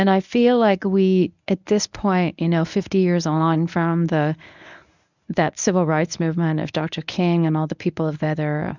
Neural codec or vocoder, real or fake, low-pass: codec, 24 kHz, 0.9 kbps, WavTokenizer, medium speech release version 1; fake; 7.2 kHz